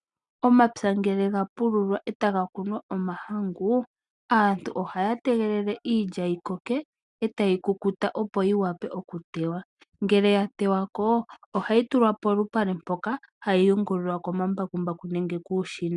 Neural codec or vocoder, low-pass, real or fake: none; 10.8 kHz; real